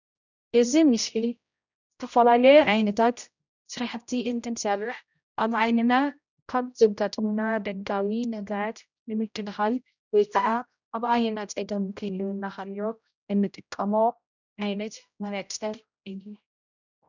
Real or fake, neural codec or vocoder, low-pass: fake; codec, 16 kHz, 0.5 kbps, X-Codec, HuBERT features, trained on general audio; 7.2 kHz